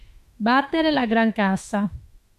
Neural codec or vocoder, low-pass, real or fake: autoencoder, 48 kHz, 32 numbers a frame, DAC-VAE, trained on Japanese speech; 14.4 kHz; fake